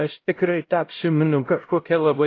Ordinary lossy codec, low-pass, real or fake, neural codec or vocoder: AAC, 32 kbps; 7.2 kHz; fake; codec, 16 kHz, 0.5 kbps, X-Codec, HuBERT features, trained on LibriSpeech